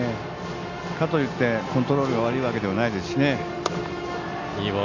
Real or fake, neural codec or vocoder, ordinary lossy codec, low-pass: real; none; none; 7.2 kHz